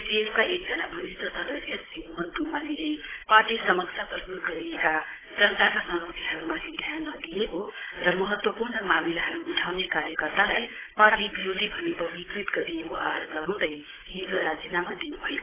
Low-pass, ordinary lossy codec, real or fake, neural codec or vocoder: 3.6 kHz; AAC, 16 kbps; fake; codec, 16 kHz, 16 kbps, FunCodec, trained on Chinese and English, 50 frames a second